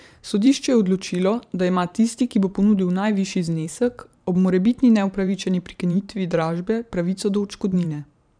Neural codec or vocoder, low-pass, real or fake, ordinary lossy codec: vocoder, 44.1 kHz, 128 mel bands every 512 samples, BigVGAN v2; 9.9 kHz; fake; none